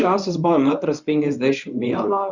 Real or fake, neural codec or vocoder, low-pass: fake; codec, 24 kHz, 0.9 kbps, WavTokenizer, medium speech release version 1; 7.2 kHz